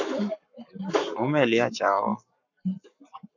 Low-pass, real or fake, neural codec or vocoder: 7.2 kHz; fake; codec, 44.1 kHz, 7.8 kbps, Pupu-Codec